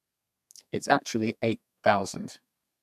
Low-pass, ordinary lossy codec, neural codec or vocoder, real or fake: 14.4 kHz; none; codec, 32 kHz, 1.9 kbps, SNAC; fake